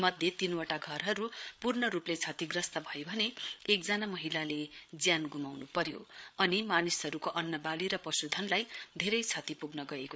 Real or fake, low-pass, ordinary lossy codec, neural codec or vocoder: fake; none; none; codec, 16 kHz, 8 kbps, FreqCodec, larger model